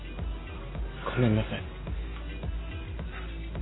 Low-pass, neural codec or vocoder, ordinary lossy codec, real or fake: 7.2 kHz; none; AAC, 16 kbps; real